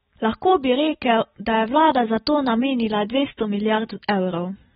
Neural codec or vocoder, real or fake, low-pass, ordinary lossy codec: none; real; 19.8 kHz; AAC, 16 kbps